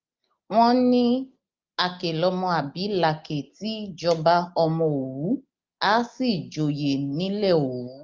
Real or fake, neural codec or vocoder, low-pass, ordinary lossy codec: real; none; 7.2 kHz; Opus, 24 kbps